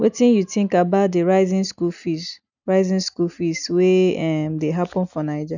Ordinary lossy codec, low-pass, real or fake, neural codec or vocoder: none; 7.2 kHz; real; none